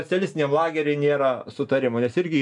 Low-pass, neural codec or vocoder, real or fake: 10.8 kHz; none; real